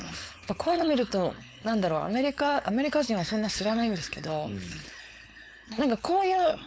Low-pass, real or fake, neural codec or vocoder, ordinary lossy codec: none; fake; codec, 16 kHz, 4.8 kbps, FACodec; none